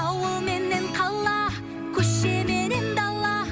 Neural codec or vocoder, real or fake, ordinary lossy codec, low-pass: none; real; none; none